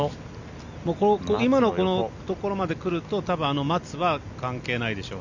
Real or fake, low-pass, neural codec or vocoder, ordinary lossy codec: real; 7.2 kHz; none; Opus, 64 kbps